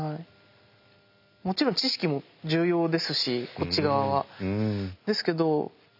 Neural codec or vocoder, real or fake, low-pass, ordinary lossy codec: none; real; 5.4 kHz; none